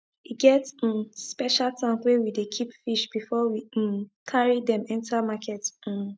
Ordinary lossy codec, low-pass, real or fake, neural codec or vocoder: none; none; real; none